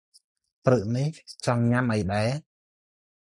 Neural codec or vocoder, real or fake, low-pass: none; real; 10.8 kHz